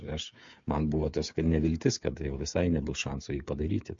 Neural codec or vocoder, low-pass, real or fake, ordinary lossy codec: codec, 16 kHz, 8 kbps, FreqCodec, smaller model; 7.2 kHz; fake; MP3, 48 kbps